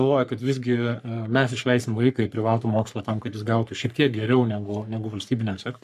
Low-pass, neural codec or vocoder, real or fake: 14.4 kHz; codec, 44.1 kHz, 3.4 kbps, Pupu-Codec; fake